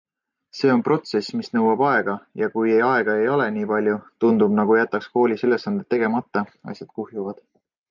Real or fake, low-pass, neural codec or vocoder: real; 7.2 kHz; none